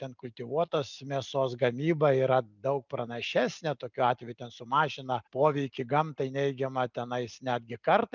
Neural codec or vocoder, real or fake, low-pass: none; real; 7.2 kHz